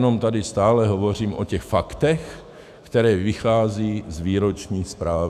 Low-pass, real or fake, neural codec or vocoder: 14.4 kHz; fake; autoencoder, 48 kHz, 128 numbers a frame, DAC-VAE, trained on Japanese speech